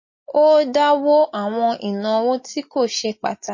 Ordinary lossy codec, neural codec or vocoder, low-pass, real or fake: MP3, 32 kbps; none; 7.2 kHz; real